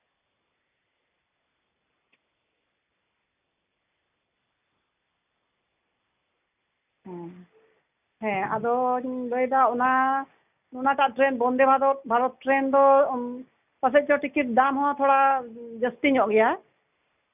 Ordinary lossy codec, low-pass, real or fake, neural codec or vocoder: none; 3.6 kHz; real; none